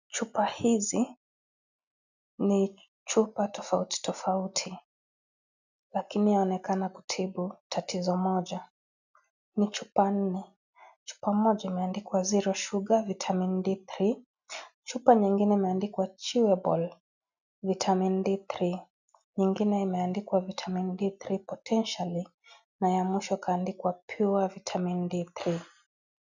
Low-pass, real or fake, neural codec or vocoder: 7.2 kHz; real; none